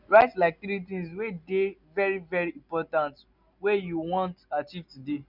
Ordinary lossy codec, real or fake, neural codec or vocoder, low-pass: none; real; none; 5.4 kHz